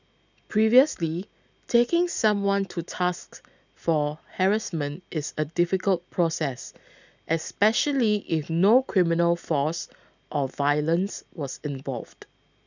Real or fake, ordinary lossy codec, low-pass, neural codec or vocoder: real; none; 7.2 kHz; none